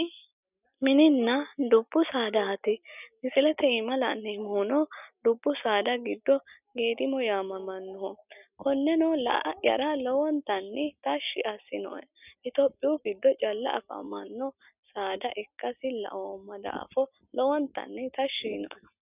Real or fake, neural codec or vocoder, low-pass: real; none; 3.6 kHz